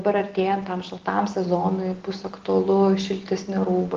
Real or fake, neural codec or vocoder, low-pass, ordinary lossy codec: real; none; 7.2 kHz; Opus, 16 kbps